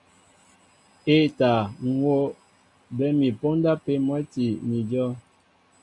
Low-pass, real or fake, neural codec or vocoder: 10.8 kHz; real; none